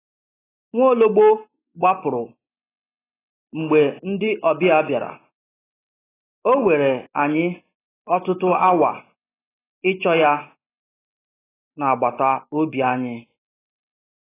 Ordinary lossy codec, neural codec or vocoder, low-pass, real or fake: AAC, 16 kbps; none; 3.6 kHz; real